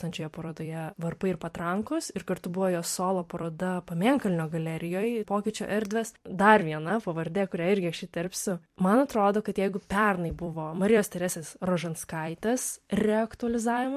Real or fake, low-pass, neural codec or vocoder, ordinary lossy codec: fake; 14.4 kHz; vocoder, 44.1 kHz, 128 mel bands every 256 samples, BigVGAN v2; MP3, 64 kbps